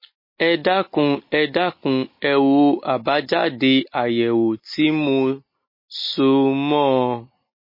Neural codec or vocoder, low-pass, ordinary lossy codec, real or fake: none; 5.4 kHz; MP3, 24 kbps; real